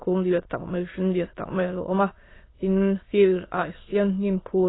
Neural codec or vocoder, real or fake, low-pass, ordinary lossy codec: autoencoder, 22.05 kHz, a latent of 192 numbers a frame, VITS, trained on many speakers; fake; 7.2 kHz; AAC, 16 kbps